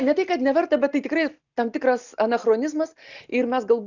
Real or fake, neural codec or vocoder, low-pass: real; none; 7.2 kHz